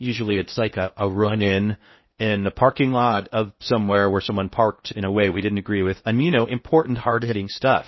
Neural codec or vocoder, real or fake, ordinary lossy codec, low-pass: codec, 16 kHz in and 24 kHz out, 0.6 kbps, FocalCodec, streaming, 2048 codes; fake; MP3, 24 kbps; 7.2 kHz